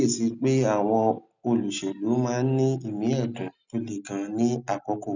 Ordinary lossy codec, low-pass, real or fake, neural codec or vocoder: none; 7.2 kHz; real; none